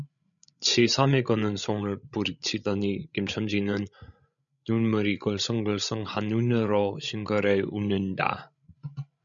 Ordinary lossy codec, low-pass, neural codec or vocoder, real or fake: MP3, 96 kbps; 7.2 kHz; codec, 16 kHz, 16 kbps, FreqCodec, larger model; fake